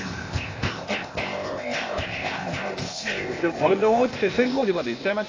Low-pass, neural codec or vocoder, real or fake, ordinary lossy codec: 7.2 kHz; codec, 16 kHz, 0.8 kbps, ZipCodec; fake; AAC, 32 kbps